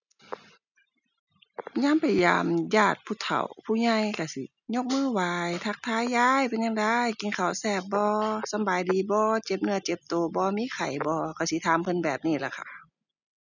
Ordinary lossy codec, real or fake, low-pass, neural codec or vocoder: none; real; 7.2 kHz; none